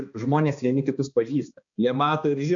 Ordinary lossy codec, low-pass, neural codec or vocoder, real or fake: AAC, 64 kbps; 7.2 kHz; codec, 16 kHz, 2 kbps, X-Codec, HuBERT features, trained on balanced general audio; fake